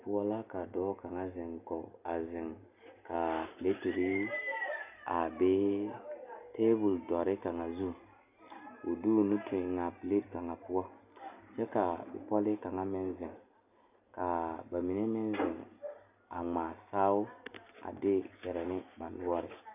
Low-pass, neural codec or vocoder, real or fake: 3.6 kHz; none; real